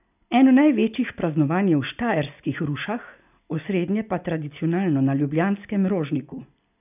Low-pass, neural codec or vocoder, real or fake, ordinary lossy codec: 3.6 kHz; none; real; none